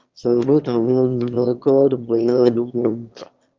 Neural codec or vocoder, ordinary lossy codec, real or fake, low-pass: autoencoder, 22.05 kHz, a latent of 192 numbers a frame, VITS, trained on one speaker; Opus, 24 kbps; fake; 7.2 kHz